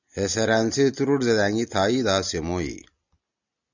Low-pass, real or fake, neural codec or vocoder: 7.2 kHz; real; none